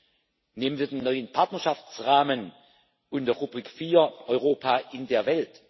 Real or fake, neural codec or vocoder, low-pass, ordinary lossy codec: real; none; 7.2 kHz; MP3, 24 kbps